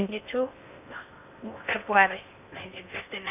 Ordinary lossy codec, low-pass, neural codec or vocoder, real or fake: none; 3.6 kHz; codec, 16 kHz in and 24 kHz out, 0.6 kbps, FocalCodec, streaming, 2048 codes; fake